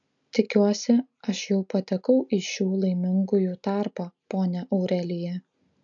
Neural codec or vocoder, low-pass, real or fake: none; 7.2 kHz; real